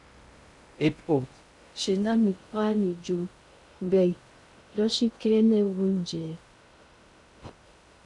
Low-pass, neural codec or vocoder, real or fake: 10.8 kHz; codec, 16 kHz in and 24 kHz out, 0.6 kbps, FocalCodec, streaming, 2048 codes; fake